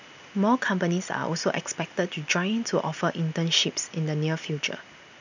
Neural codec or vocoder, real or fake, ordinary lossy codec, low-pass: none; real; none; 7.2 kHz